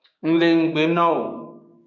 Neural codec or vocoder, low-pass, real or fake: codec, 16 kHz, 6 kbps, DAC; 7.2 kHz; fake